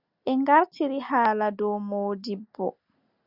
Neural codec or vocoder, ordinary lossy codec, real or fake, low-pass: none; Opus, 64 kbps; real; 5.4 kHz